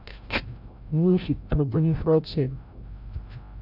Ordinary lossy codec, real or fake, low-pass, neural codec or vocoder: AAC, 48 kbps; fake; 5.4 kHz; codec, 16 kHz, 0.5 kbps, FreqCodec, larger model